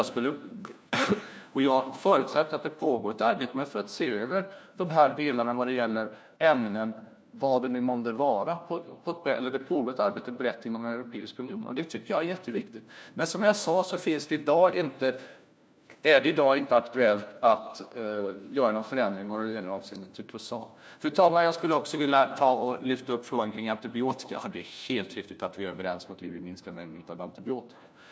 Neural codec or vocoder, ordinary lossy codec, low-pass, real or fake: codec, 16 kHz, 1 kbps, FunCodec, trained on LibriTTS, 50 frames a second; none; none; fake